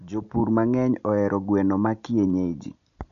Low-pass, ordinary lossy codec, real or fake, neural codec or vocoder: 7.2 kHz; none; real; none